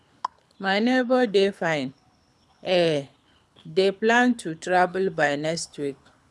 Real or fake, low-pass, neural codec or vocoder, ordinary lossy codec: fake; none; codec, 24 kHz, 6 kbps, HILCodec; none